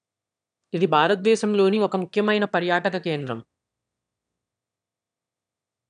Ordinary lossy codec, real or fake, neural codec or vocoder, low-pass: none; fake; autoencoder, 22.05 kHz, a latent of 192 numbers a frame, VITS, trained on one speaker; 9.9 kHz